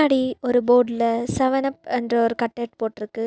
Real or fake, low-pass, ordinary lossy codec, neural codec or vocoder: real; none; none; none